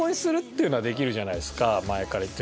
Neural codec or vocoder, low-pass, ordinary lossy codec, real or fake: none; none; none; real